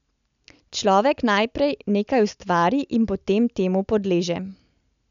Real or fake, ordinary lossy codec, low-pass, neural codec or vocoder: real; none; 7.2 kHz; none